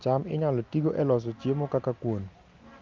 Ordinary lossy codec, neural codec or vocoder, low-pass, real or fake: Opus, 32 kbps; none; 7.2 kHz; real